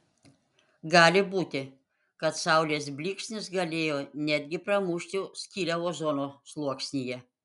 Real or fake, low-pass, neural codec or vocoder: real; 10.8 kHz; none